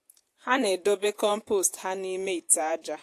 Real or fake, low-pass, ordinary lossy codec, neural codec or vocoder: fake; 14.4 kHz; AAC, 64 kbps; vocoder, 48 kHz, 128 mel bands, Vocos